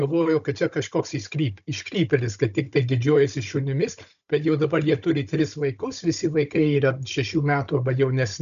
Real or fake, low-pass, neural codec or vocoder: fake; 7.2 kHz; codec, 16 kHz, 16 kbps, FunCodec, trained on Chinese and English, 50 frames a second